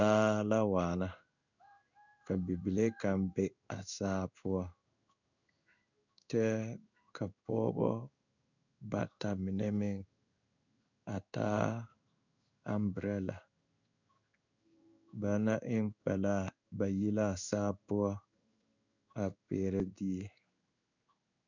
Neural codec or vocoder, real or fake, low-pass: codec, 16 kHz in and 24 kHz out, 1 kbps, XY-Tokenizer; fake; 7.2 kHz